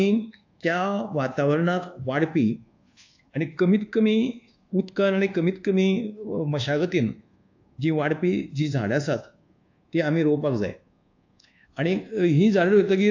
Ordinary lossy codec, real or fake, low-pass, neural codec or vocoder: AAC, 48 kbps; fake; 7.2 kHz; codec, 24 kHz, 1.2 kbps, DualCodec